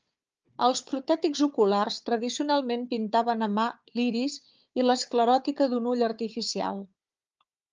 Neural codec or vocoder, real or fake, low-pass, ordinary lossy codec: codec, 16 kHz, 4 kbps, FunCodec, trained on Chinese and English, 50 frames a second; fake; 7.2 kHz; Opus, 24 kbps